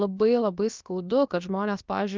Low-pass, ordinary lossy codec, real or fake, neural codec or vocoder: 7.2 kHz; Opus, 24 kbps; fake; codec, 16 kHz, about 1 kbps, DyCAST, with the encoder's durations